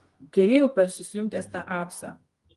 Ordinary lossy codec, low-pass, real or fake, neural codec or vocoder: Opus, 24 kbps; 10.8 kHz; fake; codec, 24 kHz, 0.9 kbps, WavTokenizer, medium music audio release